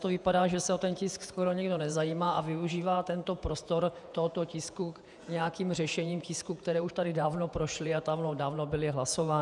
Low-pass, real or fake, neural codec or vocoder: 10.8 kHz; fake; vocoder, 48 kHz, 128 mel bands, Vocos